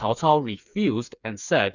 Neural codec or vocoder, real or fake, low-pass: codec, 16 kHz, 4 kbps, FreqCodec, smaller model; fake; 7.2 kHz